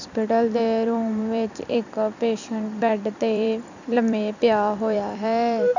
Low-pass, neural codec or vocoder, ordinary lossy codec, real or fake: 7.2 kHz; vocoder, 44.1 kHz, 128 mel bands every 256 samples, BigVGAN v2; none; fake